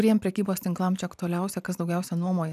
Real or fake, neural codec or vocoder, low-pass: fake; vocoder, 44.1 kHz, 128 mel bands every 512 samples, BigVGAN v2; 14.4 kHz